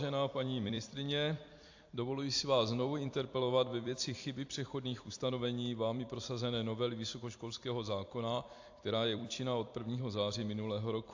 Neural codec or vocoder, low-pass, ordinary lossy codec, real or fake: vocoder, 44.1 kHz, 128 mel bands every 256 samples, BigVGAN v2; 7.2 kHz; AAC, 48 kbps; fake